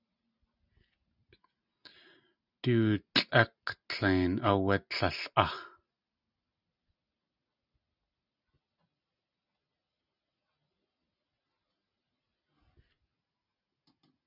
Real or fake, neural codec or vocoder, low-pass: real; none; 5.4 kHz